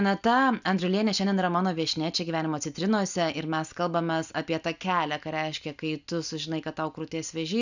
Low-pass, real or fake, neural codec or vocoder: 7.2 kHz; real; none